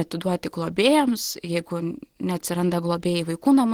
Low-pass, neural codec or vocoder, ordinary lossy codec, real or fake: 19.8 kHz; none; Opus, 16 kbps; real